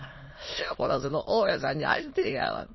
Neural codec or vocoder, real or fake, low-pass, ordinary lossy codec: autoencoder, 22.05 kHz, a latent of 192 numbers a frame, VITS, trained on many speakers; fake; 7.2 kHz; MP3, 24 kbps